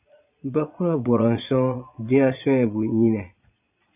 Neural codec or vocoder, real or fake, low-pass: vocoder, 22.05 kHz, 80 mel bands, Vocos; fake; 3.6 kHz